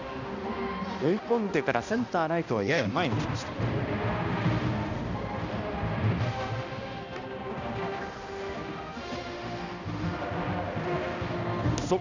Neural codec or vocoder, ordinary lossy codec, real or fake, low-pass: codec, 16 kHz, 1 kbps, X-Codec, HuBERT features, trained on balanced general audio; none; fake; 7.2 kHz